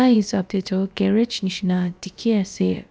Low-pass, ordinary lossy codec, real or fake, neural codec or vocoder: none; none; fake; codec, 16 kHz, about 1 kbps, DyCAST, with the encoder's durations